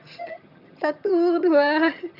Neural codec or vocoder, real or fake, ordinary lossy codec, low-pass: vocoder, 22.05 kHz, 80 mel bands, HiFi-GAN; fake; none; 5.4 kHz